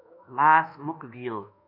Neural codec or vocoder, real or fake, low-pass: codec, 24 kHz, 1.2 kbps, DualCodec; fake; 5.4 kHz